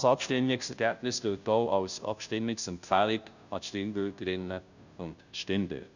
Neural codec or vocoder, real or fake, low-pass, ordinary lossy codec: codec, 16 kHz, 0.5 kbps, FunCodec, trained on Chinese and English, 25 frames a second; fake; 7.2 kHz; none